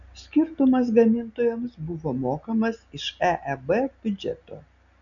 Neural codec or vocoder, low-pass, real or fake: none; 7.2 kHz; real